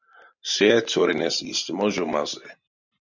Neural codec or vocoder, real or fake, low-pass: vocoder, 44.1 kHz, 128 mel bands every 512 samples, BigVGAN v2; fake; 7.2 kHz